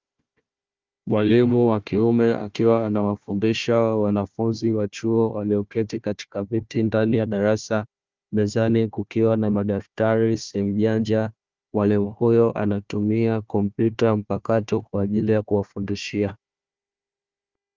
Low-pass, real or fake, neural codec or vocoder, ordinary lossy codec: 7.2 kHz; fake; codec, 16 kHz, 1 kbps, FunCodec, trained on Chinese and English, 50 frames a second; Opus, 24 kbps